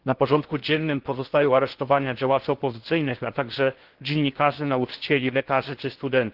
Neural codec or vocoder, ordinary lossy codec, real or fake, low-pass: codec, 16 kHz in and 24 kHz out, 0.8 kbps, FocalCodec, streaming, 65536 codes; Opus, 16 kbps; fake; 5.4 kHz